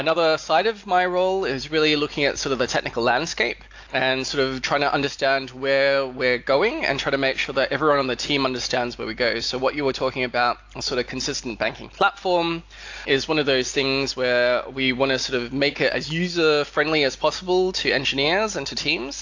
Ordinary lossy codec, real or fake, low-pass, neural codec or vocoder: AAC, 48 kbps; real; 7.2 kHz; none